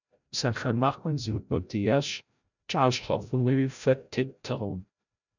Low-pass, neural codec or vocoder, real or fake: 7.2 kHz; codec, 16 kHz, 0.5 kbps, FreqCodec, larger model; fake